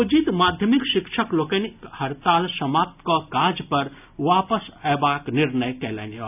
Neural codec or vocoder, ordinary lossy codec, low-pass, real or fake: none; none; 3.6 kHz; real